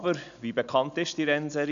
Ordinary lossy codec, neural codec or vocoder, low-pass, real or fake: MP3, 96 kbps; none; 7.2 kHz; real